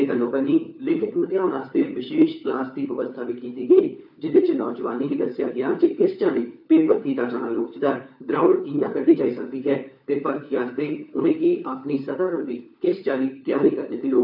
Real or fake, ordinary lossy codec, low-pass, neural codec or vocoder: fake; none; 5.4 kHz; codec, 16 kHz, 4 kbps, FunCodec, trained on LibriTTS, 50 frames a second